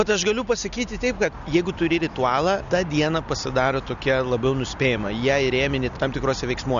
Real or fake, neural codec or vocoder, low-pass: real; none; 7.2 kHz